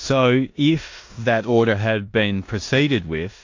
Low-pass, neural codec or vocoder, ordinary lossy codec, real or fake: 7.2 kHz; codec, 16 kHz in and 24 kHz out, 0.9 kbps, LongCat-Audio-Codec, four codebook decoder; AAC, 48 kbps; fake